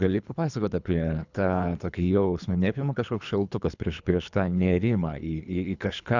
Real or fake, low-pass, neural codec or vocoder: fake; 7.2 kHz; codec, 24 kHz, 3 kbps, HILCodec